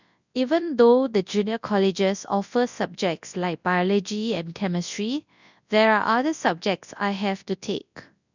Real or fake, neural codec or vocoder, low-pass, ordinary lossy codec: fake; codec, 24 kHz, 0.9 kbps, WavTokenizer, large speech release; 7.2 kHz; none